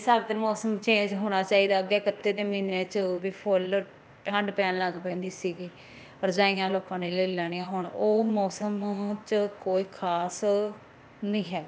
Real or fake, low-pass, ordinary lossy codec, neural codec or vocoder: fake; none; none; codec, 16 kHz, 0.8 kbps, ZipCodec